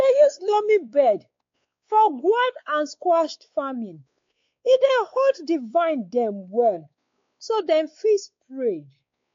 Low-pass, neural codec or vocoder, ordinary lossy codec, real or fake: 7.2 kHz; codec, 16 kHz, 4 kbps, X-Codec, WavLM features, trained on Multilingual LibriSpeech; AAC, 48 kbps; fake